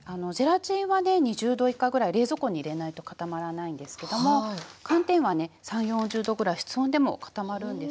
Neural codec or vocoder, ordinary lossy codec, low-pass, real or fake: none; none; none; real